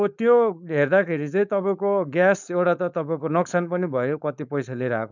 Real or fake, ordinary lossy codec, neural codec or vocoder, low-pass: fake; none; codec, 16 kHz, 4.8 kbps, FACodec; 7.2 kHz